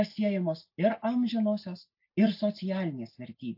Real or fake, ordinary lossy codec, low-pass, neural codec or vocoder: real; AAC, 48 kbps; 5.4 kHz; none